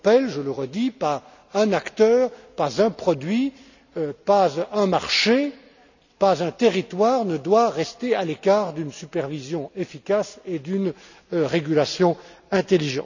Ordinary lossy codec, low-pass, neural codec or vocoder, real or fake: none; 7.2 kHz; none; real